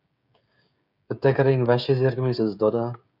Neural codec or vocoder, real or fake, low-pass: codec, 16 kHz, 16 kbps, FreqCodec, smaller model; fake; 5.4 kHz